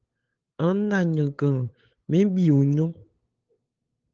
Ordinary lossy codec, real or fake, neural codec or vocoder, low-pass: Opus, 16 kbps; fake; codec, 16 kHz, 8 kbps, FunCodec, trained on LibriTTS, 25 frames a second; 7.2 kHz